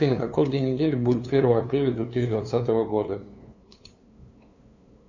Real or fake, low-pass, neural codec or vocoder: fake; 7.2 kHz; codec, 16 kHz, 2 kbps, FunCodec, trained on LibriTTS, 25 frames a second